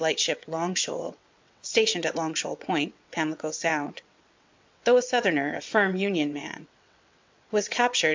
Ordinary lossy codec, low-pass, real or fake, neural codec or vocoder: MP3, 64 kbps; 7.2 kHz; fake; vocoder, 22.05 kHz, 80 mel bands, WaveNeXt